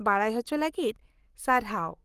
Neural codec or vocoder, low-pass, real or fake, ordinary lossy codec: codec, 44.1 kHz, 7.8 kbps, Pupu-Codec; 14.4 kHz; fake; Opus, 24 kbps